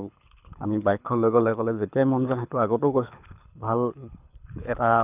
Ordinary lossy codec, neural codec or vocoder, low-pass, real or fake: none; codec, 24 kHz, 6 kbps, HILCodec; 3.6 kHz; fake